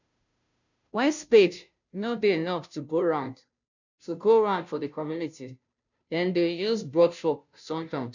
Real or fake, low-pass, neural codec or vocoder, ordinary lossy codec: fake; 7.2 kHz; codec, 16 kHz, 0.5 kbps, FunCodec, trained on Chinese and English, 25 frames a second; none